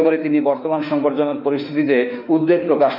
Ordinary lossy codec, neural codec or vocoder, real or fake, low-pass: none; autoencoder, 48 kHz, 32 numbers a frame, DAC-VAE, trained on Japanese speech; fake; 5.4 kHz